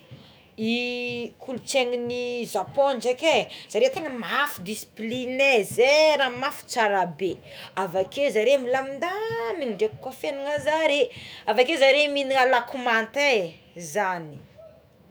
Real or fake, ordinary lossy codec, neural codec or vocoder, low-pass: fake; none; autoencoder, 48 kHz, 128 numbers a frame, DAC-VAE, trained on Japanese speech; none